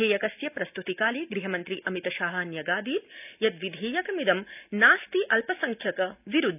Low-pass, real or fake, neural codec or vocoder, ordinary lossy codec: 3.6 kHz; real; none; none